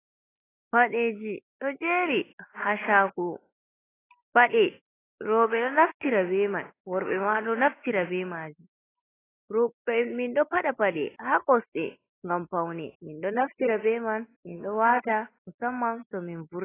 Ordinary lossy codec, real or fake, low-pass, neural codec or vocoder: AAC, 16 kbps; real; 3.6 kHz; none